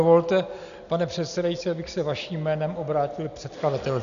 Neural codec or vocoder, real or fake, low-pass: none; real; 7.2 kHz